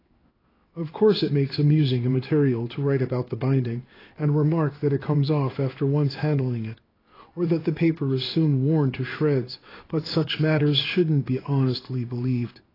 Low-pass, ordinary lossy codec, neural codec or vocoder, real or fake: 5.4 kHz; AAC, 24 kbps; codec, 16 kHz in and 24 kHz out, 1 kbps, XY-Tokenizer; fake